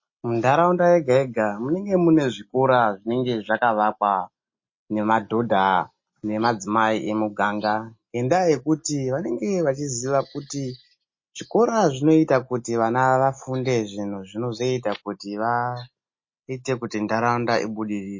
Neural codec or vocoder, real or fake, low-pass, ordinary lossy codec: none; real; 7.2 kHz; MP3, 32 kbps